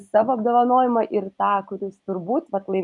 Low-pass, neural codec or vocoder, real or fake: 10.8 kHz; none; real